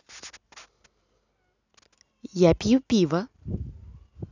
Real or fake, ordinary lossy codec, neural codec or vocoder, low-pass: real; none; none; 7.2 kHz